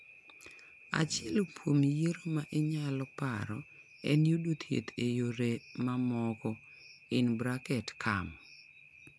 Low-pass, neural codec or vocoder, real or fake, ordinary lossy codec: none; none; real; none